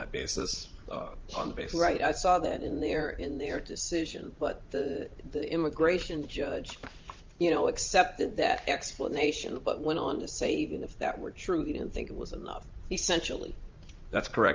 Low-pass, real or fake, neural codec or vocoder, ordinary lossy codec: 7.2 kHz; fake; vocoder, 44.1 kHz, 80 mel bands, Vocos; Opus, 24 kbps